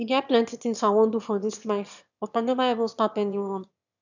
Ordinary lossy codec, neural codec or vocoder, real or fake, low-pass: none; autoencoder, 22.05 kHz, a latent of 192 numbers a frame, VITS, trained on one speaker; fake; 7.2 kHz